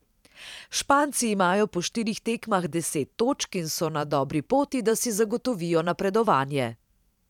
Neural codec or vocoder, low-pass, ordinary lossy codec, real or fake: vocoder, 44.1 kHz, 128 mel bands every 512 samples, BigVGAN v2; 19.8 kHz; none; fake